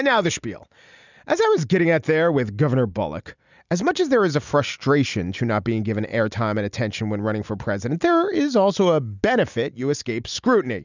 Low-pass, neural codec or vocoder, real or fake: 7.2 kHz; none; real